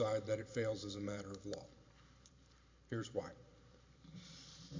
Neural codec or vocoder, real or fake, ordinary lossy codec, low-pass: vocoder, 44.1 kHz, 128 mel bands every 512 samples, BigVGAN v2; fake; MP3, 48 kbps; 7.2 kHz